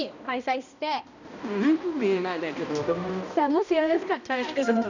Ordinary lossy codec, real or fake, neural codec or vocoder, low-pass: none; fake; codec, 16 kHz, 1 kbps, X-Codec, HuBERT features, trained on balanced general audio; 7.2 kHz